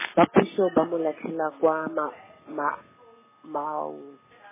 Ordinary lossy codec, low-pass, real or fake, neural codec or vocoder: MP3, 16 kbps; 3.6 kHz; real; none